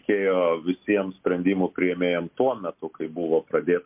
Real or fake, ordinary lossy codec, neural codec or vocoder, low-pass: real; MP3, 32 kbps; none; 3.6 kHz